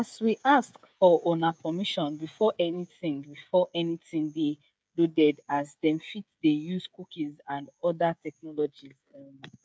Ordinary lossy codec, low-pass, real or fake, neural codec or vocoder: none; none; fake; codec, 16 kHz, 16 kbps, FreqCodec, smaller model